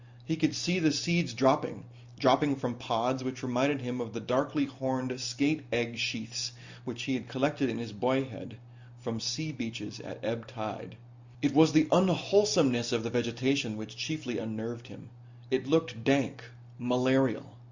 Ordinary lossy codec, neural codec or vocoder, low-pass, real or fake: Opus, 64 kbps; none; 7.2 kHz; real